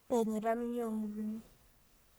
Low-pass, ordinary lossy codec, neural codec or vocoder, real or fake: none; none; codec, 44.1 kHz, 1.7 kbps, Pupu-Codec; fake